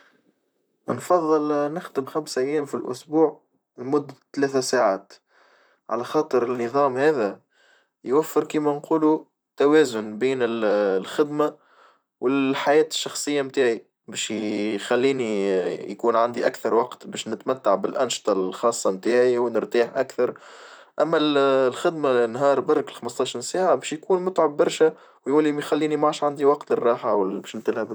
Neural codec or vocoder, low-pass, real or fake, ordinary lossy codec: vocoder, 44.1 kHz, 128 mel bands, Pupu-Vocoder; none; fake; none